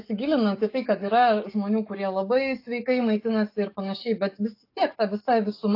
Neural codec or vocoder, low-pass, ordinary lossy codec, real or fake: codec, 44.1 kHz, 7.8 kbps, DAC; 5.4 kHz; AAC, 32 kbps; fake